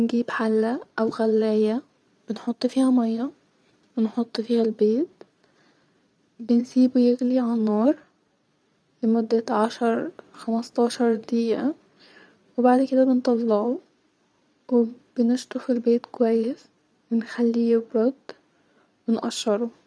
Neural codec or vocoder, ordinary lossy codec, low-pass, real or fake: vocoder, 22.05 kHz, 80 mel bands, Vocos; none; none; fake